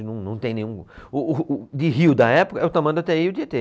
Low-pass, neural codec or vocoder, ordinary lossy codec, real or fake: none; none; none; real